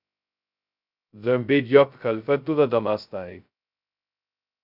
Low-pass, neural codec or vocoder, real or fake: 5.4 kHz; codec, 16 kHz, 0.2 kbps, FocalCodec; fake